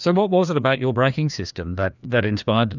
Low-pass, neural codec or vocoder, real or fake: 7.2 kHz; codec, 16 kHz, 2 kbps, FreqCodec, larger model; fake